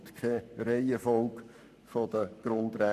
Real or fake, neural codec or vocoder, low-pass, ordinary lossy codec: fake; codec, 44.1 kHz, 7.8 kbps, Pupu-Codec; 14.4 kHz; none